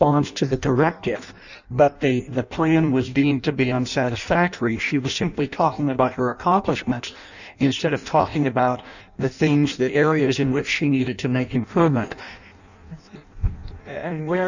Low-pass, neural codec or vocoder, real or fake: 7.2 kHz; codec, 16 kHz in and 24 kHz out, 0.6 kbps, FireRedTTS-2 codec; fake